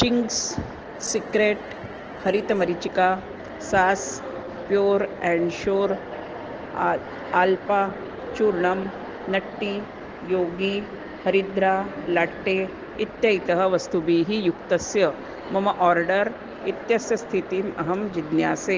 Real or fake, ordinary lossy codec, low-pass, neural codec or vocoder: real; Opus, 16 kbps; 7.2 kHz; none